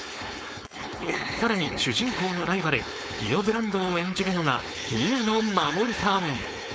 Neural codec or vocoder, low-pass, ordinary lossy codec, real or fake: codec, 16 kHz, 4.8 kbps, FACodec; none; none; fake